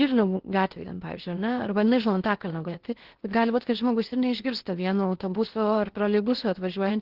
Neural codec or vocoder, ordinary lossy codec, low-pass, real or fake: codec, 16 kHz in and 24 kHz out, 0.8 kbps, FocalCodec, streaming, 65536 codes; Opus, 16 kbps; 5.4 kHz; fake